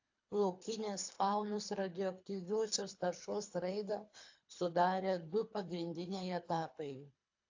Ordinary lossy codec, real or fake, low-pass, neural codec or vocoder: AAC, 48 kbps; fake; 7.2 kHz; codec, 24 kHz, 3 kbps, HILCodec